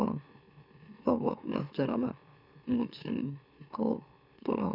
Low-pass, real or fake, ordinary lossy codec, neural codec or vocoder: 5.4 kHz; fake; none; autoencoder, 44.1 kHz, a latent of 192 numbers a frame, MeloTTS